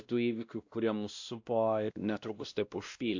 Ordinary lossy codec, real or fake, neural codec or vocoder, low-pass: AAC, 48 kbps; fake; codec, 16 kHz, 1 kbps, X-Codec, WavLM features, trained on Multilingual LibriSpeech; 7.2 kHz